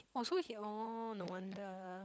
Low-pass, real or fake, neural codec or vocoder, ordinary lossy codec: none; fake; codec, 16 kHz, 16 kbps, FunCodec, trained on LibriTTS, 50 frames a second; none